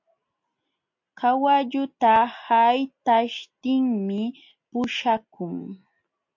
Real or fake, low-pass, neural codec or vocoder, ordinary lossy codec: real; 7.2 kHz; none; MP3, 48 kbps